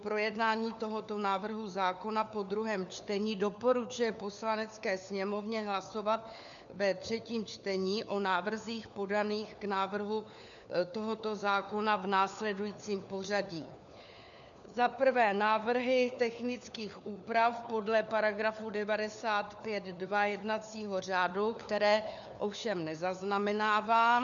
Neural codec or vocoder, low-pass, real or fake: codec, 16 kHz, 4 kbps, FunCodec, trained on LibriTTS, 50 frames a second; 7.2 kHz; fake